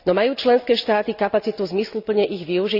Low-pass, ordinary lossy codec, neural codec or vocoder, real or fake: 5.4 kHz; none; none; real